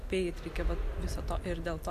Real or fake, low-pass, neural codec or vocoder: real; 14.4 kHz; none